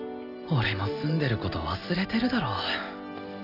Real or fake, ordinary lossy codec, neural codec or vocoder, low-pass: real; none; none; 5.4 kHz